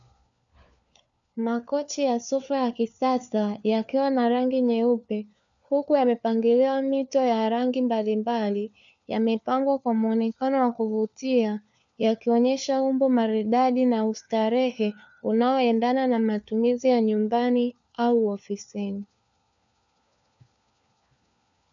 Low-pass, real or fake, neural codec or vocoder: 7.2 kHz; fake; codec, 16 kHz, 4 kbps, FunCodec, trained on LibriTTS, 50 frames a second